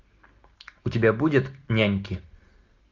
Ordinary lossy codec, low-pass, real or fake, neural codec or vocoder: MP3, 48 kbps; 7.2 kHz; real; none